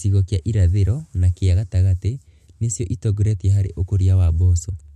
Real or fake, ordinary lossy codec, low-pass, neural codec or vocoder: real; MP3, 64 kbps; 14.4 kHz; none